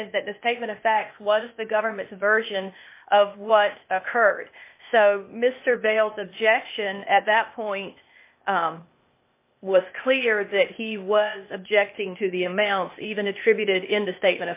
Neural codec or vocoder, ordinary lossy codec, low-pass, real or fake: codec, 16 kHz, 0.8 kbps, ZipCodec; MP3, 24 kbps; 3.6 kHz; fake